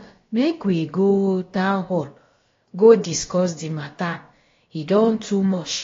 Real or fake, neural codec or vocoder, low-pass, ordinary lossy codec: fake; codec, 16 kHz, about 1 kbps, DyCAST, with the encoder's durations; 7.2 kHz; AAC, 32 kbps